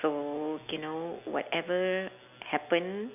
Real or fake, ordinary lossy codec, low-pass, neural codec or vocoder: real; none; 3.6 kHz; none